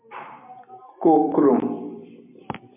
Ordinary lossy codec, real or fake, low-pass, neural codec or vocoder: MP3, 32 kbps; fake; 3.6 kHz; vocoder, 44.1 kHz, 128 mel bands every 256 samples, BigVGAN v2